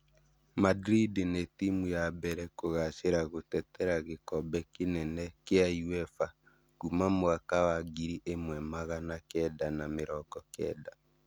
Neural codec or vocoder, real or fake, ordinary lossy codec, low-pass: none; real; none; none